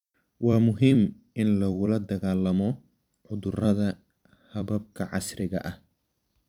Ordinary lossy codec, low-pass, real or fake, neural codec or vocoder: none; 19.8 kHz; fake; vocoder, 44.1 kHz, 128 mel bands every 256 samples, BigVGAN v2